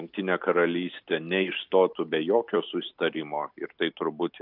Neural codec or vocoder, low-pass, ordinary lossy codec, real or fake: none; 5.4 kHz; AAC, 48 kbps; real